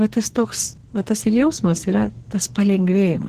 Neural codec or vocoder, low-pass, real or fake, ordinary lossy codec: codec, 44.1 kHz, 2.6 kbps, SNAC; 14.4 kHz; fake; Opus, 16 kbps